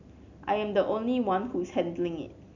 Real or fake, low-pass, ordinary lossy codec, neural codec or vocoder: real; 7.2 kHz; none; none